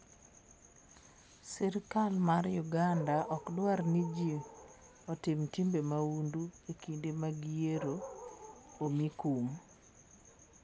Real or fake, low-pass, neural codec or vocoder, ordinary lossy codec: real; none; none; none